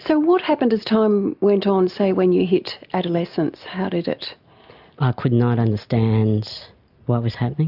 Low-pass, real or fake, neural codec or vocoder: 5.4 kHz; real; none